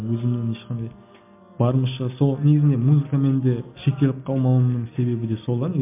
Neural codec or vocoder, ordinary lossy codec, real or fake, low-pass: none; MP3, 32 kbps; real; 3.6 kHz